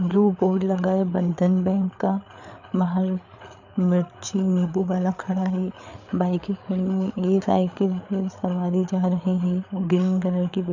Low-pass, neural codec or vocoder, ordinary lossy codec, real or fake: 7.2 kHz; codec, 16 kHz, 8 kbps, FreqCodec, larger model; none; fake